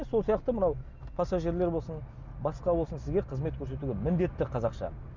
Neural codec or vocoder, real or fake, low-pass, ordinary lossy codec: none; real; 7.2 kHz; none